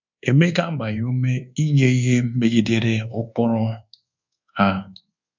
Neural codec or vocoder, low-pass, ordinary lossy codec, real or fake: codec, 24 kHz, 1.2 kbps, DualCodec; 7.2 kHz; MP3, 64 kbps; fake